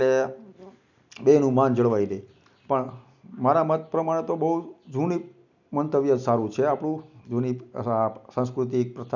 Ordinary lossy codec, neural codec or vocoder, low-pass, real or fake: none; none; 7.2 kHz; real